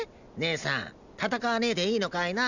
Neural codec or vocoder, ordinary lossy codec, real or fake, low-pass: none; none; real; 7.2 kHz